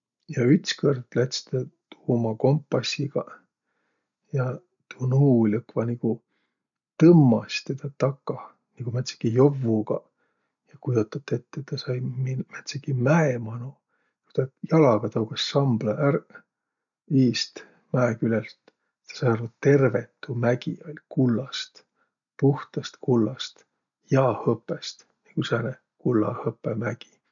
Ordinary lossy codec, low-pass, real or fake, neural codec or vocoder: none; 7.2 kHz; real; none